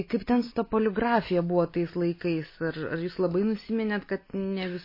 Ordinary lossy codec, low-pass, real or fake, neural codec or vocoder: MP3, 24 kbps; 5.4 kHz; real; none